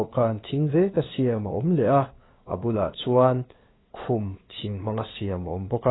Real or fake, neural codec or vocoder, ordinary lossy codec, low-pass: fake; codec, 16 kHz, 2 kbps, FunCodec, trained on LibriTTS, 25 frames a second; AAC, 16 kbps; 7.2 kHz